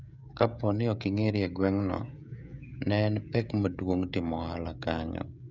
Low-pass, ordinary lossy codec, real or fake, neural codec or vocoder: 7.2 kHz; none; fake; codec, 16 kHz, 16 kbps, FreqCodec, smaller model